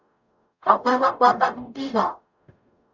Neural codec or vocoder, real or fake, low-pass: codec, 44.1 kHz, 0.9 kbps, DAC; fake; 7.2 kHz